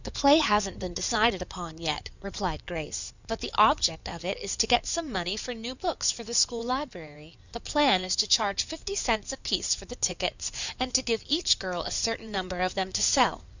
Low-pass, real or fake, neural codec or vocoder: 7.2 kHz; fake; codec, 16 kHz in and 24 kHz out, 2.2 kbps, FireRedTTS-2 codec